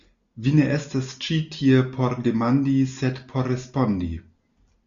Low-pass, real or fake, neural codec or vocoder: 7.2 kHz; real; none